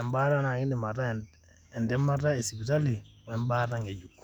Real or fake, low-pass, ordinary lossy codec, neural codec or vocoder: fake; 19.8 kHz; none; autoencoder, 48 kHz, 128 numbers a frame, DAC-VAE, trained on Japanese speech